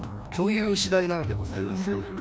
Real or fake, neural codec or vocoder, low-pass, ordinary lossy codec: fake; codec, 16 kHz, 1 kbps, FreqCodec, larger model; none; none